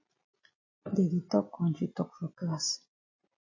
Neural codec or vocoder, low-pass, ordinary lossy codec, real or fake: none; 7.2 kHz; MP3, 32 kbps; real